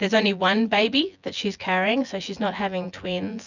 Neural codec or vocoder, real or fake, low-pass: vocoder, 24 kHz, 100 mel bands, Vocos; fake; 7.2 kHz